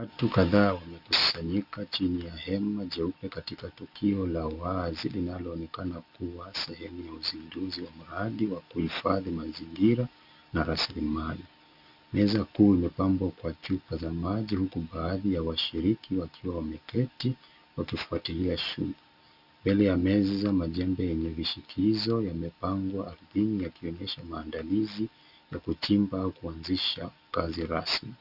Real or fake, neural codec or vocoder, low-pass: real; none; 5.4 kHz